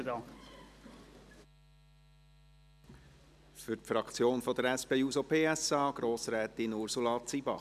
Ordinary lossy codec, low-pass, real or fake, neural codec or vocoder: none; 14.4 kHz; real; none